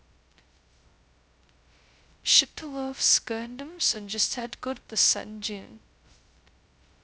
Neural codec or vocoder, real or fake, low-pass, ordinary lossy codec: codec, 16 kHz, 0.2 kbps, FocalCodec; fake; none; none